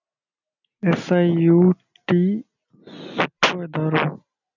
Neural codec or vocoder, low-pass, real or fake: none; 7.2 kHz; real